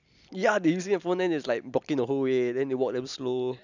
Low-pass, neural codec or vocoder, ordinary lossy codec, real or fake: 7.2 kHz; none; none; real